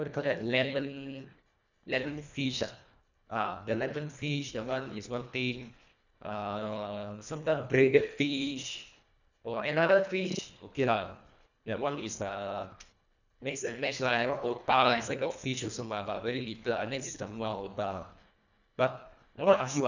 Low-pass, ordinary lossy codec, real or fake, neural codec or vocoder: 7.2 kHz; none; fake; codec, 24 kHz, 1.5 kbps, HILCodec